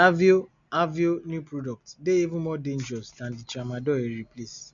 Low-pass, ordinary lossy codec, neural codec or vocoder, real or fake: 7.2 kHz; MP3, 96 kbps; none; real